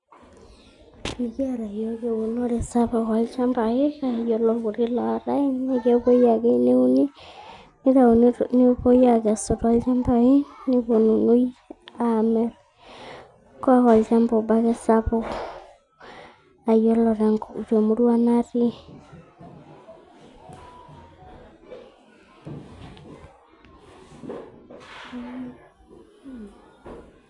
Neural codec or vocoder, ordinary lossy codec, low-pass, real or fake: none; none; 10.8 kHz; real